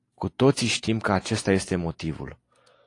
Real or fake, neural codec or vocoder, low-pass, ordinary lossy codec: real; none; 10.8 kHz; AAC, 48 kbps